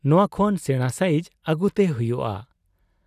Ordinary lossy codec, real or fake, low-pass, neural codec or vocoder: none; real; 14.4 kHz; none